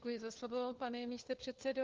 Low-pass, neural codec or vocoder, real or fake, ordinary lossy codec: 7.2 kHz; codec, 16 kHz, 4 kbps, FunCodec, trained on LibriTTS, 50 frames a second; fake; Opus, 16 kbps